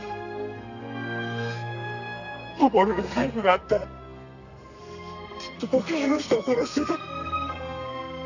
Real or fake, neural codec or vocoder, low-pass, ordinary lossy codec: fake; codec, 32 kHz, 1.9 kbps, SNAC; 7.2 kHz; none